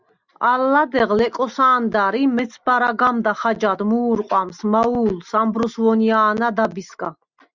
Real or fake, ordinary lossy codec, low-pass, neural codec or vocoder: real; Opus, 64 kbps; 7.2 kHz; none